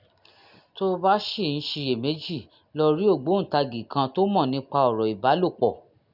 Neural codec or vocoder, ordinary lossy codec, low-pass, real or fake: none; none; 5.4 kHz; real